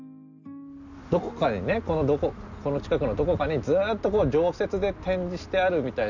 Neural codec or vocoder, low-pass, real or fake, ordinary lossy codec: none; 7.2 kHz; real; none